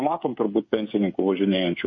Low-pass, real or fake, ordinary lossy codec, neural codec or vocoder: 7.2 kHz; fake; MP3, 32 kbps; codec, 16 kHz, 8 kbps, FreqCodec, smaller model